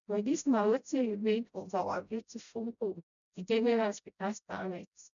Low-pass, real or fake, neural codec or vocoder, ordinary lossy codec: 7.2 kHz; fake; codec, 16 kHz, 0.5 kbps, FreqCodec, smaller model; none